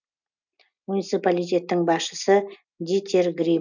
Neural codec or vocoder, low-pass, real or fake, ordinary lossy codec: none; 7.2 kHz; real; none